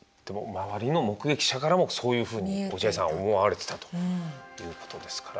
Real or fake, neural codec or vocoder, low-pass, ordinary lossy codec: real; none; none; none